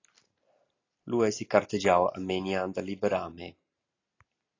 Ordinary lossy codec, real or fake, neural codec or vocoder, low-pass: AAC, 32 kbps; real; none; 7.2 kHz